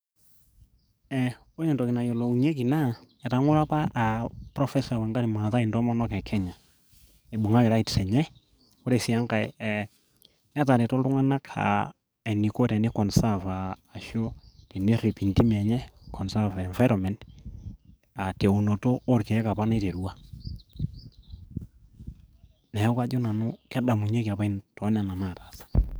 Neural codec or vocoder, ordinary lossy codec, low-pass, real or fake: codec, 44.1 kHz, 7.8 kbps, DAC; none; none; fake